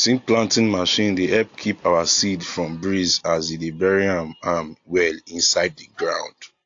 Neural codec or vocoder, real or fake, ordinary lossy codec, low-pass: none; real; AAC, 48 kbps; 7.2 kHz